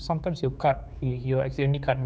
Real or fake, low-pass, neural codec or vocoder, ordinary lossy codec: fake; none; codec, 16 kHz, 4 kbps, X-Codec, HuBERT features, trained on general audio; none